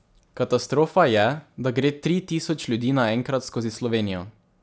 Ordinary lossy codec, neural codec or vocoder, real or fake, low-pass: none; none; real; none